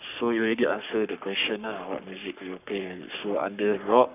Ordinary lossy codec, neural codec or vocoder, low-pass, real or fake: none; codec, 44.1 kHz, 3.4 kbps, Pupu-Codec; 3.6 kHz; fake